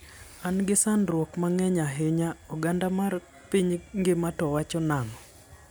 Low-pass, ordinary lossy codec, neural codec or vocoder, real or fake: none; none; none; real